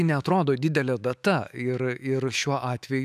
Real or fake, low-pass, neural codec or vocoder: fake; 14.4 kHz; autoencoder, 48 kHz, 128 numbers a frame, DAC-VAE, trained on Japanese speech